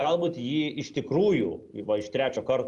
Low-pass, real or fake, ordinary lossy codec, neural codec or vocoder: 10.8 kHz; real; Opus, 32 kbps; none